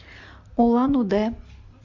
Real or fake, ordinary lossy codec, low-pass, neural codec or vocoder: real; MP3, 64 kbps; 7.2 kHz; none